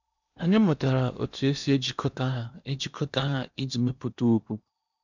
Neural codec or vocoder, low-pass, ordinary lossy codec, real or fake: codec, 16 kHz in and 24 kHz out, 0.8 kbps, FocalCodec, streaming, 65536 codes; 7.2 kHz; none; fake